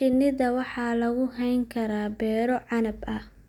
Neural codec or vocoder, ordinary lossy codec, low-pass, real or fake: none; none; 19.8 kHz; real